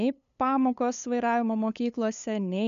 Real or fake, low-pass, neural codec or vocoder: fake; 7.2 kHz; codec, 16 kHz, 8 kbps, FunCodec, trained on LibriTTS, 25 frames a second